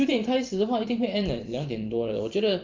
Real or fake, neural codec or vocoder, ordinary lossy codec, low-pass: fake; vocoder, 44.1 kHz, 128 mel bands every 512 samples, BigVGAN v2; Opus, 24 kbps; 7.2 kHz